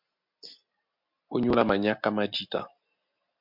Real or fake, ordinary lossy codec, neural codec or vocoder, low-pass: real; MP3, 48 kbps; none; 5.4 kHz